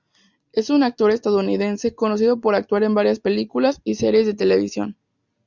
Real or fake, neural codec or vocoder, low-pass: real; none; 7.2 kHz